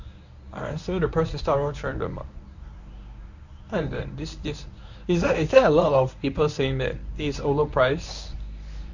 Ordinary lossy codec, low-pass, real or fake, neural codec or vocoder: none; 7.2 kHz; fake; codec, 24 kHz, 0.9 kbps, WavTokenizer, medium speech release version 1